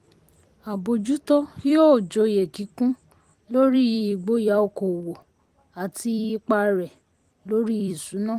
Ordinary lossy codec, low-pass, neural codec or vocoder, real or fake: Opus, 24 kbps; 14.4 kHz; vocoder, 44.1 kHz, 128 mel bands every 256 samples, BigVGAN v2; fake